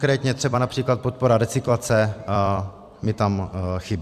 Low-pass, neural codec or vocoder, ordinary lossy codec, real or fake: 14.4 kHz; vocoder, 44.1 kHz, 128 mel bands every 256 samples, BigVGAN v2; AAC, 96 kbps; fake